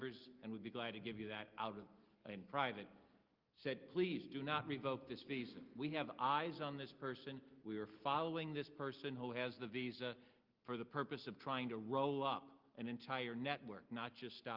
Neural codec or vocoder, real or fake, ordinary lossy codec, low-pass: none; real; Opus, 32 kbps; 5.4 kHz